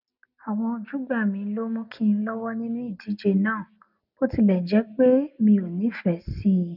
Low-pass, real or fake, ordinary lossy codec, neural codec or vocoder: 5.4 kHz; real; none; none